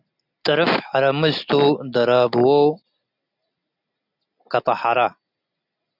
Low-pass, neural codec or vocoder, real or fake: 5.4 kHz; none; real